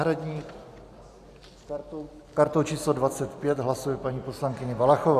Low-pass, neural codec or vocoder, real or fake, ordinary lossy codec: 14.4 kHz; none; real; AAC, 96 kbps